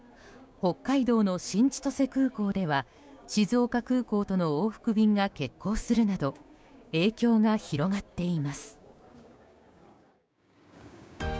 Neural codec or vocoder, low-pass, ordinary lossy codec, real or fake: codec, 16 kHz, 6 kbps, DAC; none; none; fake